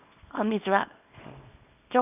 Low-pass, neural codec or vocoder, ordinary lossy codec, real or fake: 3.6 kHz; codec, 24 kHz, 0.9 kbps, WavTokenizer, small release; none; fake